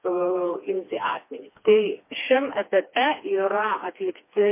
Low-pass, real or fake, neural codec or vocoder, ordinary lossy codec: 3.6 kHz; fake; codec, 16 kHz, 2 kbps, FreqCodec, smaller model; MP3, 24 kbps